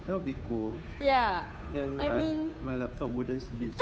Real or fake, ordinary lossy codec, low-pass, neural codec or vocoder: fake; none; none; codec, 16 kHz, 2 kbps, FunCodec, trained on Chinese and English, 25 frames a second